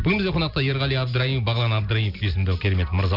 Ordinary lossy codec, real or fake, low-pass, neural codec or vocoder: AAC, 48 kbps; real; 5.4 kHz; none